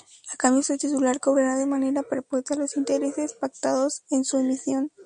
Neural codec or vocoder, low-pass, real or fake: none; 9.9 kHz; real